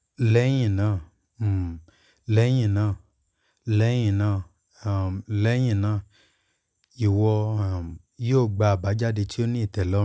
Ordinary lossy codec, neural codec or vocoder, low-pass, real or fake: none; none; none; real